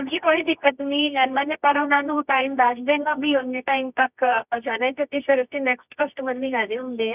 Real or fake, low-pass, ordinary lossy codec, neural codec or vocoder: fake; 3.6 kHz; none; codec, 24 kHz, 0.9 kbps, WavTokenizer, medium music audio release